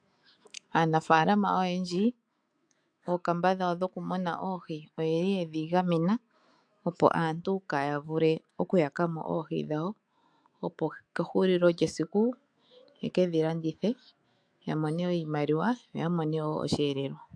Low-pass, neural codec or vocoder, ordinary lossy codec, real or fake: 9.9 kHz; autoencoder, 48 kHz, 128 numbers a frame, DAC-VAE, trained on Japanese speech; AAC, 64 kbps; fake